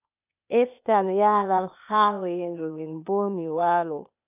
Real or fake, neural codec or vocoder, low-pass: fake; codec, 16 kHz, 0.8 kbps, ZipCodec; 3.6 kHz